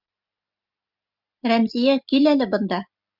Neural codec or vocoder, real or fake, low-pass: none; real; 5.4 kHz